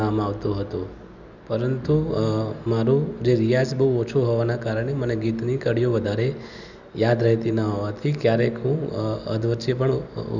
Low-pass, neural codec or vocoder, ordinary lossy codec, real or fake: 7.2 kHz; none; none; real